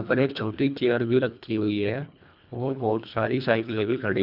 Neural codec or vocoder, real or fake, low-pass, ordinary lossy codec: codec, 24 kHz, 1.5 kbps, HILCodec; fake; 5.4 kHz; none